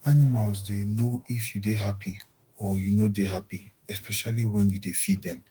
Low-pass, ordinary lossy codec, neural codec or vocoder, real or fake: 19.8 kHz; Opus, 24 kbps; autoencoder, 48 kHz, 32 numbers a frame, DAC-VAE, trained on Japanese speech; fake